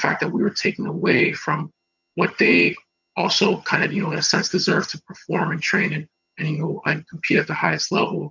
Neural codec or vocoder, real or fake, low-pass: vocoder, 22.05 kHz, 80 mel bands, HiFi-GAN; fake; 7.2 kHz